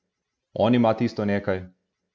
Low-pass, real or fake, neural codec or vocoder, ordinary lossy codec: none; real; none; none